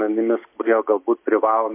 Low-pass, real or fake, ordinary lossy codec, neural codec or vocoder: 3.6 kHz; real; MP3, 24 kbps; none